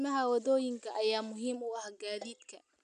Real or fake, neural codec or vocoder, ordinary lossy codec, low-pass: real; none; none; 9.9 kHz